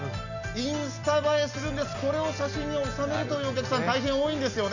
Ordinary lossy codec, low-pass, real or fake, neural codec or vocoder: none; 7.2 kHz; real; none